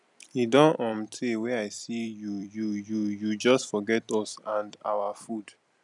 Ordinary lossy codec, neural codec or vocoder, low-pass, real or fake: none; vocoder, 44.1 kHz, 128 mel bands every 256 samples, BigVGAN v2; 10.8 kHz; fake